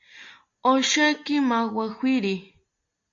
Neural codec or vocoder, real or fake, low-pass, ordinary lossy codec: none; real; 7.2 kHz; AAC, 48 kbps